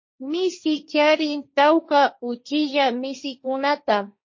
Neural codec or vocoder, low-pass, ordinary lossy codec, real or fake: codec, 16 kHz, 1.1 kbps, Voila-Tokenizer; 7.2 kHz; MP3, 32 kbps; fake